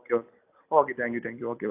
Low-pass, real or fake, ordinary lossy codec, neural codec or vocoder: 3.6 kHz; real; AAC, 32 kbps; none